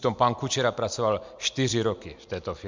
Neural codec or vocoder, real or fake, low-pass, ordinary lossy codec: none; real; 7.2 kHz; MP3, 64 kbps